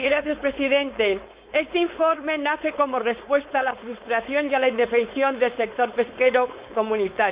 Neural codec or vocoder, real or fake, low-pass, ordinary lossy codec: codec, 16 kHz, 4.8 kbps, FACodec; fake; 3.6 kHz; Opus, 64 kbps